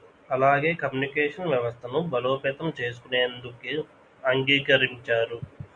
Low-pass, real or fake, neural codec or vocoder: 9.9 kHz; real; none